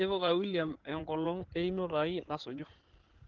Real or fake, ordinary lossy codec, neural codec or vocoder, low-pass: fake; Opus, 16 kbps; codec, 16 kHz in and 24 kHz out, 2.2 kbps, FireRedTTS-2 codec; 7.2 kHz